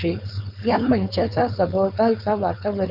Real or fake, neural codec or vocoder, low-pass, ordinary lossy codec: fake; codec, 16 kHz, 4.8 kbps, FACodec; 5.4 kHz; none